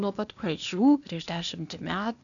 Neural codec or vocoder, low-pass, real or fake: codec, 16 kHz, 0.8 kbps, ZipCodec; 7.2 kHz; fake